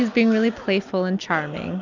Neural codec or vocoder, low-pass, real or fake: none; 7.2 kHz; real